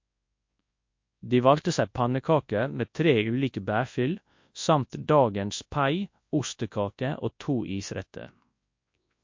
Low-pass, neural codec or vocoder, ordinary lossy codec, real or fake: 7.2 kHz; codec, 24 kHz, 0.9 kbps, WavTokenizer, large speech release; MP3, 48 kbps; fake